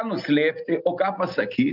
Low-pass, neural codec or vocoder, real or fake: 5.4 kHz; none; real